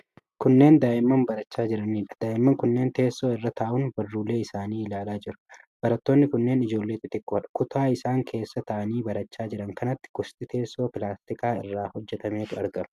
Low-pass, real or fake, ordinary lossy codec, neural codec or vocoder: 14.4 kHz; real; AAC, 96 kbps; none